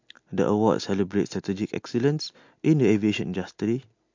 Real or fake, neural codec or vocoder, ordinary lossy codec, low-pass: real; none; MP3, 48 kbps; 7.2 kHz